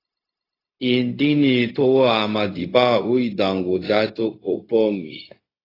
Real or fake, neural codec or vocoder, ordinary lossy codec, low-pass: fake; codec, 16 kHz, 0.4 kbps, LongCat-Audio-Codec; AAC, 24 kbps; 5.4 kHz